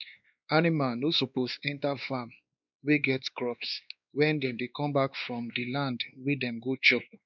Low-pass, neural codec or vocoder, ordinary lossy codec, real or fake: 7.2 kHz; codec, 16 kHz, 2 kbps, X-Codec, WavLM features, trained on Multilingual LibriSpeech; none; fake